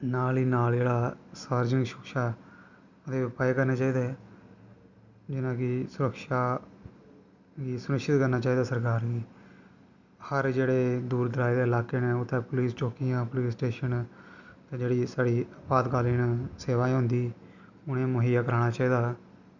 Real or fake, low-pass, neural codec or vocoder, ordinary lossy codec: real; 7.2 kHz; none; none